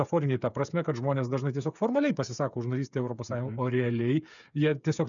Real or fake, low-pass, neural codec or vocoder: fake; 7.2 kHz; codec, 16 kHz, 8 kbps, FreqCodec, smaller model